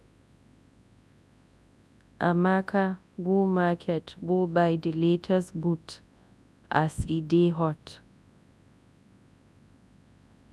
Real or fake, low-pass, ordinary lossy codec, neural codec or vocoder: fake; none; none; codec, 24 kHz, 0.9 kbps, WavTokenizer, large speech release